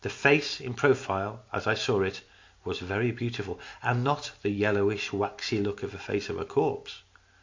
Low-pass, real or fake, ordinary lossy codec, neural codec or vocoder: 7.2 kHz; real; MP3, 48 kbps; none